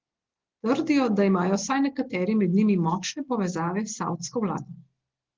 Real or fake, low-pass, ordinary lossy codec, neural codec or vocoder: real; 7.2 kHz; Opus, 16 kbps; none